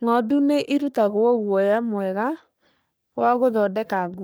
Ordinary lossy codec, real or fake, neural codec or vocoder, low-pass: none; fake; codec, 44.1 kHz, 3.4 kbps, Pupu-Codec; none